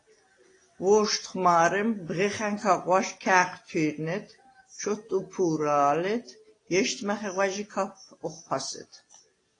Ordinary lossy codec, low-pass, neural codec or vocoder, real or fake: AAC, 32 kbps; 9.9 kHz; none; real